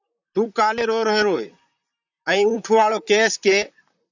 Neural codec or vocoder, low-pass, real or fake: vocoder, 44.1 kHz, 128 mel bands, Pupu-Vocoder; 7.2 kHz; fake